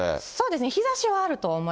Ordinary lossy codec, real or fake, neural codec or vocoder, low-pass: none; real; none; none